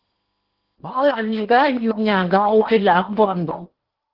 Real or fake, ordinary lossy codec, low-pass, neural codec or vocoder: fake; Opus, 16 kbps; 5.4 kHz; codec, 16 kHz in and 24 kHz out, 0.8 kbps, FocalCodec, streaming, 65536 codes